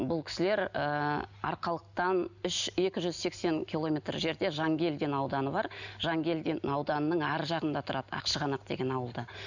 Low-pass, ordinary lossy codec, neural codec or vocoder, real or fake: 7.2 kHz; none; none; real